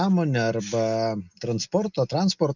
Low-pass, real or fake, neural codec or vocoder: 7.2 kHz; real; none